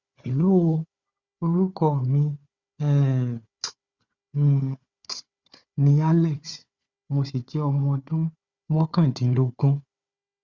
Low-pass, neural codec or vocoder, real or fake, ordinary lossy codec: 7.2 kHz; codec, 16 kHz, 4 kbps, FunCodec, trained on Chinese and English, 50 frames a second; fake; Opus, 64 kbps